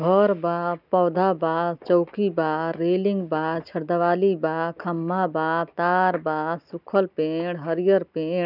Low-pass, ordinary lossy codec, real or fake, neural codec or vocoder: 5.4 kHz; none; real; none